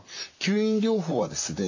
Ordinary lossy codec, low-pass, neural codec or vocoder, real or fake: AAC, 48 kbps; 7.2 kHz; vocoder, 44.1 kHz, 128 mel bands, Pupu-Vocoder; fake